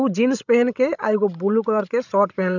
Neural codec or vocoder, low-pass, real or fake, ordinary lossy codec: codec, 16 kHz, 16 kbps, FreqCodec, larger model; 7.2 kHz; fake; none